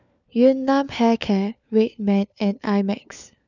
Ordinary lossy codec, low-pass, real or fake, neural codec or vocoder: none; 7.2 kHz; fake; codec, 16 kHz, 4 kbps, FunCodec, trained on LibriTTS, 50 frames a second